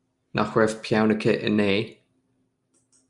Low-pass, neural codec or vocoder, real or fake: 10.8 kHz; none; real